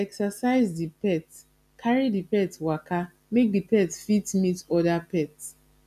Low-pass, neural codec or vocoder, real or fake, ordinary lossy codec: 14.4 kHz; none; real; none